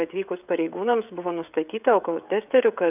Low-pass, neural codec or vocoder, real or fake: 3.6 kHz; codec, 16 kHz, 16 kbps, FreqCodec, smaller model; fake